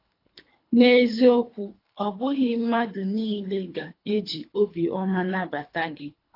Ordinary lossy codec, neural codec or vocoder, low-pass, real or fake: AAC, 32 kbps; codec, 24 kHz, 3 kbps, HILCodec; 5.4 kHz; fake